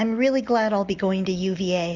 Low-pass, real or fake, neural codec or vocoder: 7.2 kHz; real; none